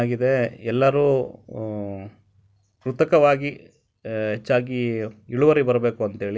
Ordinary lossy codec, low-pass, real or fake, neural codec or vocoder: none; none; real; none